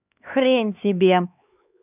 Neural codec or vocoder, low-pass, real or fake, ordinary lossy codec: codec, 16 kHz, 2 kbps, X-Codec, HuBERT features, trained on LibriSpeech; 3.6 kHz; fake; none